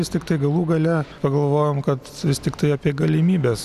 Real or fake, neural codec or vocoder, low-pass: real; none; 14.4 kHz